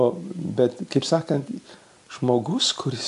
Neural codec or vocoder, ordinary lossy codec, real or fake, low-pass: none; MP3, 64 kbps; real; 10.8 kHz